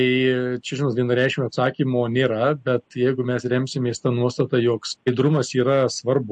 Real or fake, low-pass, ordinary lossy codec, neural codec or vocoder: real; 9.9 kHz; MP3, 48 kbps; none